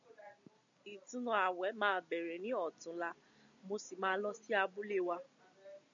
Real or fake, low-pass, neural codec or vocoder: real; 7.2 kHz; none